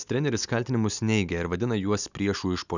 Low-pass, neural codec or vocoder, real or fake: 7.2 kHz; none; real